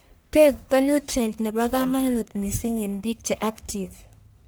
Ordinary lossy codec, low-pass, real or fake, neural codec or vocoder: none; none; fake; codec, 44.1 kHz, 1.7 kbps, Pupu-Codec